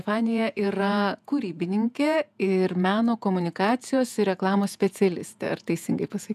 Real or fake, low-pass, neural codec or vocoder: fake; 14.4 kHz; vocoder, 48 kHz, 128 mel bands, Vocos